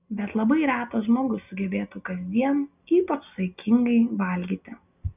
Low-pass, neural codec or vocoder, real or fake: 3.6 kHz; none; real